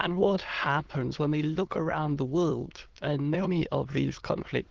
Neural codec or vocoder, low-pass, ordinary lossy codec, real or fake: autoencoder, 22.05 kHz, a latent of 192 numbers a frame, VITS, trained on many speakers; 7.2 kHz; Opus, 16 kbps; fake